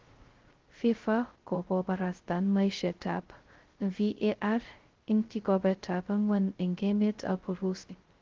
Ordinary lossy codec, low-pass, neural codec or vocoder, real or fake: Opus, 16 kbps; 7.2 kHz; codec, 16 kHz, 0.2 kbps, FocalCodec; fake